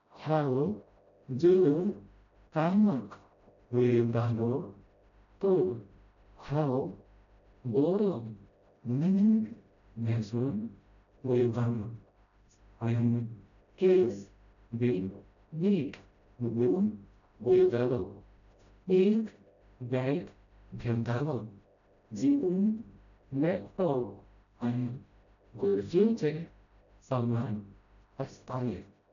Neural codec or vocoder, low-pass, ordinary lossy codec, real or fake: codec, 16 kHz, 0.5 kbps, FreqCodec, smaller model; 7.2 kHz; none; fake